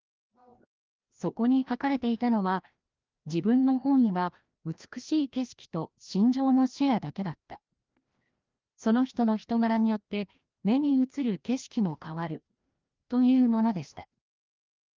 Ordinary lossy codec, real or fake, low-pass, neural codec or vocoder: Opus, 24 kbps; fake; 7.2 kHz; codec, 16 kHz, 1 kbps, FreqCodec, larger model